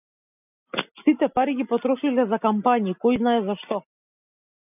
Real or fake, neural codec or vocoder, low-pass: real; none; 3.6 kHz